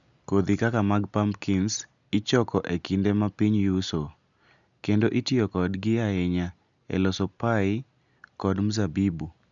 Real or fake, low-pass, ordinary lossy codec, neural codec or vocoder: real; 7.2 kHz; none; none